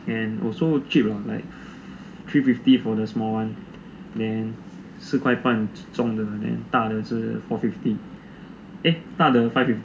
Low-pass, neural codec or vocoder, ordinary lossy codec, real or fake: none; none; none; real